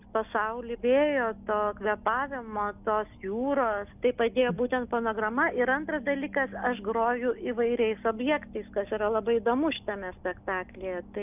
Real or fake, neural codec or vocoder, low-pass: real; none; 3.6 kHz